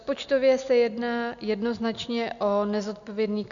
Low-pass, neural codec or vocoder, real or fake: 7.2 kHz; none; real